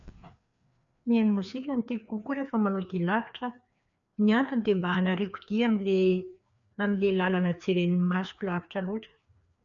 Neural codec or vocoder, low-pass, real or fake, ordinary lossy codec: codec, 16 kHz, 2 kbps, FreqCodec, larger model; 7.2 kHz; fake; none